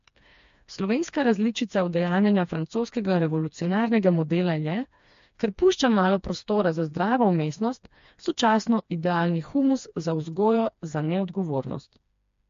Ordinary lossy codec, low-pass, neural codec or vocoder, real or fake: MP3, 48 kbps; 7.2 kHz; codec, 16 kHz, 2 kbps, FreqCodec, smaller model; fake